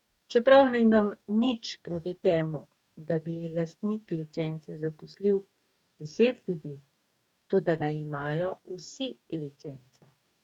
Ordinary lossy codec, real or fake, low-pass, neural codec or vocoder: none; fake; 19.8 kHz; codec, 44.1 kHz, 2.6 kbps, DAC